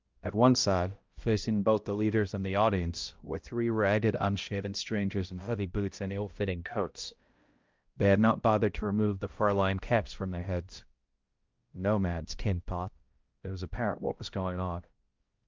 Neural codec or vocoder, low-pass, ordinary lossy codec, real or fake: codec, 16 kHz, 0.5 kbps, X-Codec, HuBERT features, trained on balanced general audio; 7.2 kHz; Opus, 32 kbps; fake